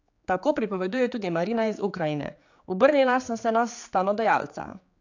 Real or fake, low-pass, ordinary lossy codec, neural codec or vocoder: fake; 7.2 kHz; AAC, 48 kbps; codec, 16 kHz, 4 kbps, X-Codec, HuBERT features, trained on general audio